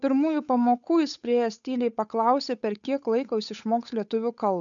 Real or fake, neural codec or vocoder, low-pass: fake; codec, 16 kHz, 8 kbps, FunCodec, trained on Chinese and English, 25 frames a second; 7.2 kHz